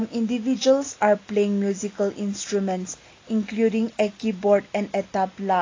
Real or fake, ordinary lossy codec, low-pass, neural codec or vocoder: real; AAC, 32 kbps; 7.2 kHz; none